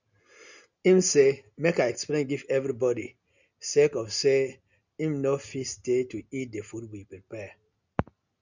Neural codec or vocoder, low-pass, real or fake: none; 7.2 kHz; real